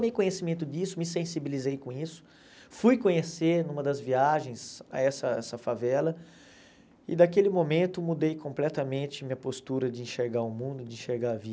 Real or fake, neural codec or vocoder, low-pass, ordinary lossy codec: real; none; none; none